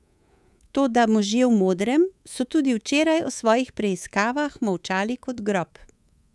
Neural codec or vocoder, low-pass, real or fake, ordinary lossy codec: codec, 24 kHz, 3.1 kbps, DualCodec; none; fake; none